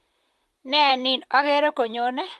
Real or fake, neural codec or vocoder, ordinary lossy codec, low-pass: fake; vocoder, 44.1 kHz, 128 mel bands, Pupu-Vocoder; Opus, 24 kbps; 14.4 kHz